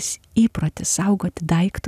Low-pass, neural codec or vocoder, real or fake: 14.4 kHz; none; real